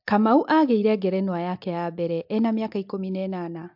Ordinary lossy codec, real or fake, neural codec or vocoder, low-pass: MP3, 48 kbps; real; none; 5.4 kHz